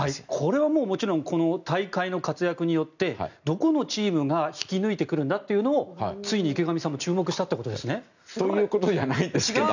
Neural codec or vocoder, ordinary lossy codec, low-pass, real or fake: none; none; 7.2 kHz; real